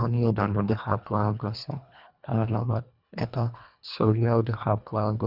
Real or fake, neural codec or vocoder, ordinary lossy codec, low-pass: fake; codec, 24 kHz, 1.5 kbps, HILCodec; none; 5.4 kHz